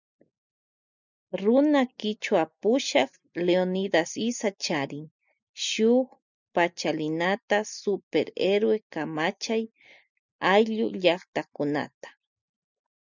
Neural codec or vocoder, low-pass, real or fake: none; 7.2 kHz; real